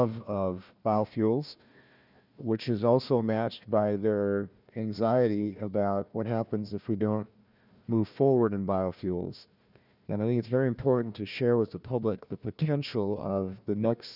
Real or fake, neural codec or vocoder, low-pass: fake; codec, 16 kHz, 1 kbps, FunCodec, trained on Chinese and English, 50 frames a second; 5.4 kHz